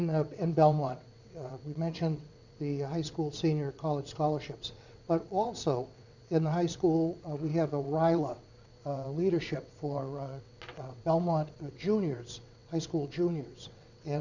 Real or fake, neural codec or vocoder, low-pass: fake; vocoder, 22.05 kHz, 80 mel bands, WaveNeXt; 7.2 kHz